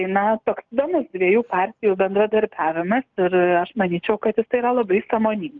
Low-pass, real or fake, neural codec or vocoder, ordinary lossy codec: 7.2 kHz; real; none; Opus, 24 kbps